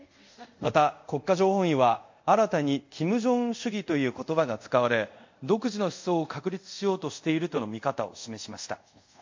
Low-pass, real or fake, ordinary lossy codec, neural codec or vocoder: 7.2 kHz; fake; MP3, 48 kbps; codec, 24 kHz, 0.5 kbps, DualCodec